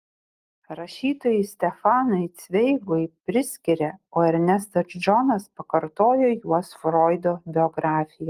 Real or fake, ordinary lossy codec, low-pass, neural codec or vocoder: real; Opus, 24 kbps; 14.4 kHz; none